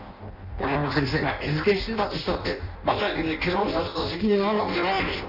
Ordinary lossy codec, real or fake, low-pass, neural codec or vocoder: none; fake; 5.4 kHz; codec, 16 kHz in and 24 kHz out, 0.6 kbps, FireRedTTS-2 codec